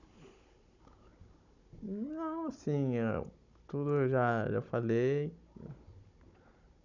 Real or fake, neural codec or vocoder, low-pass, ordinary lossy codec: fake; codec, 16 kHz, 16 kbps, FunCodec, trained on Chinese and English, 50 frames a second; 7.2 kHz; none